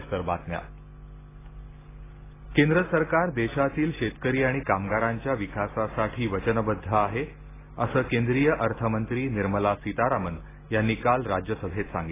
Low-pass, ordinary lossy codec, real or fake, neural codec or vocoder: 3.6 kHz; AAC, 16 kbps; real; none